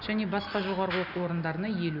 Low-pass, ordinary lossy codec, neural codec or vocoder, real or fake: 5.4 kHz; none; none; real